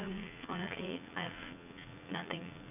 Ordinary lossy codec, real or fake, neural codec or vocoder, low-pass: none; fake; vocoder, 44.1 kHz, 80 mel bands, Vocos; 3.6 kHz